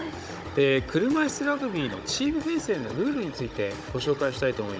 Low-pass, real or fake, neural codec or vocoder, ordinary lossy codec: none; fake; codec, 16 kHz, 16 kbps, FunCodec, trained on Chinese and English, 50 frames a second; none